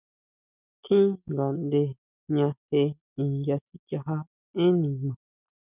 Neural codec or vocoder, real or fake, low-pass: none; real; 3.6 kHz